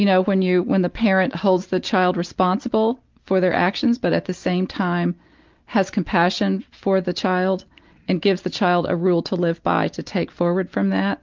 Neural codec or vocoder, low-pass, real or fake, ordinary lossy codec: none; 7.2 kHz; real; Opus, 24 kbps